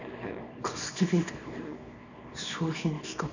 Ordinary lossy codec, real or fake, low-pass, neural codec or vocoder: none; fake; 7.2 kHz; codec, 24 kHz, 0.9 kbps, WavTokenizer, small release